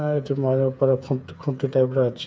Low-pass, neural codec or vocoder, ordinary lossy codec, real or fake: none; codec, 16 kHz, 8 kbps, FreqCodec, smaller model; none; fake